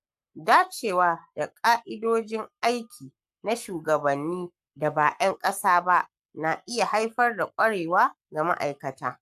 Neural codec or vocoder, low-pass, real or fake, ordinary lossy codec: codec, 44.1 kHz, 7.8 kbps, Pupu-Codec; 14.4 kHz; fake; AAC, 96 kbps